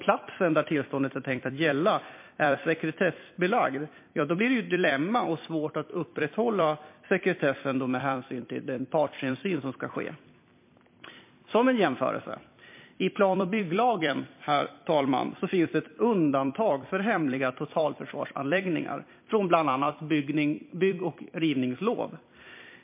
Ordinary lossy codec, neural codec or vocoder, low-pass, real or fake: MP3, 24 kbps; vocoder, 44.1 kHz, 128 mel bands every 256 samples, BigVGAN v2; 3.6 kHz; fake